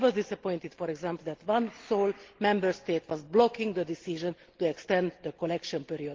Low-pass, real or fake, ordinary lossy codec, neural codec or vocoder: 7.2 kHz; real; Opus, 16 kbps; none